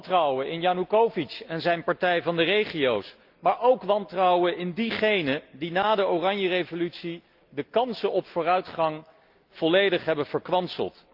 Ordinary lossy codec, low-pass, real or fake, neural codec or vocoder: Opus, 24 kbps; 5.4 kHz; real; none